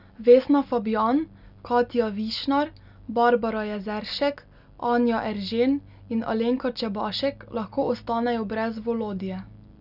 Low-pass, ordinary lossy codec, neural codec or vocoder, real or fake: 5.4 kHz; none; none; real